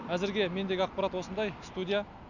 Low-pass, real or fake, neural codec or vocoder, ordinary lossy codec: 7.2 kHz; real; none; none